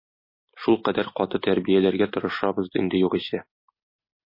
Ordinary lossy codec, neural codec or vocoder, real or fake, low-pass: MP3, 24 kbps; none; real; 5.4 kHz